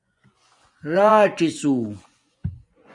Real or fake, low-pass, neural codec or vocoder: fake; 10.8 kHz; vocoder, 24 kHz, 100 mel bands, Vocos